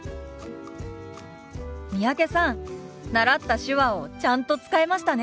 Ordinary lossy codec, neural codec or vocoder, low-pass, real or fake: none; none; none; real